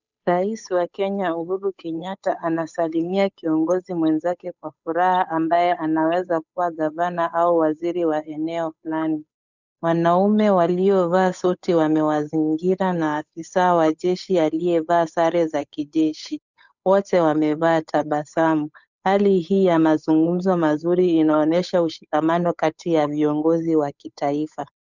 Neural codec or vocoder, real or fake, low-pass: codec, 16 kHz, 8 kbps, FunCodec, trained on Chinese and English, 25 frames a second; fake; 7.2 kHz